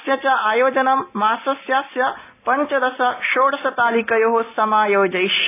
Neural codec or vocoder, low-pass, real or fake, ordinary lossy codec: none; 3.6 kHz; real; AAC, 24 kbps